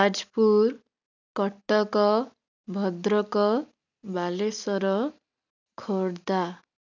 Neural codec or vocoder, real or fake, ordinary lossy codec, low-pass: none; real; none; 7.2 kHz